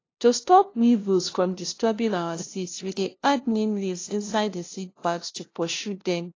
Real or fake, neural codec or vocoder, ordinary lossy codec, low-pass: fake; codec, 16 kHz, 0.5 kbps, FunCodec, trained on LibriTTS, 25 frames a second; AAC, 32 kbps; 7.2 kHz